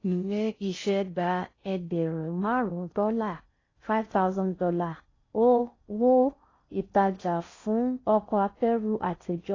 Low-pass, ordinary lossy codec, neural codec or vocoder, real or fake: 7.2 kHz; AAC, 32 kbps; codec, 16 kHz in and 24 kHz out, 0.6 kbps, FocalCodec, streaming, 4096 codes; fake